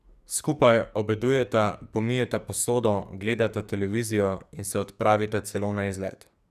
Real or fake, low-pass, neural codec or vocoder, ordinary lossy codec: fake; 14.4 kHz; codec, 44.1 kHz, 2.6 kbps, SNAC; none